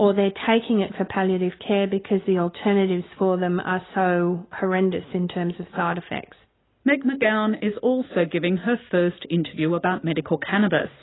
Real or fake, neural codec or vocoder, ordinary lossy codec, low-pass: real; none; AAC, 16 kbps; 7.2 kHz